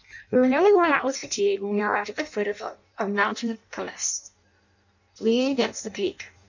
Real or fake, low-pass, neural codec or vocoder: fake; 7.2 kHz; codec, 16 kHz in and 24 kHz out, 0.6 kbps, FireRedTTS-2 codec